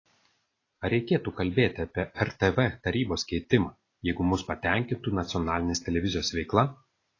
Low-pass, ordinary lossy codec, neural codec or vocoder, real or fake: 7.2 kHz; AAC, 32 kbps; none; real